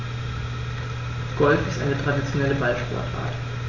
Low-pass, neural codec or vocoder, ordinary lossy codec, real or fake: 7.2 kHz; none; none; real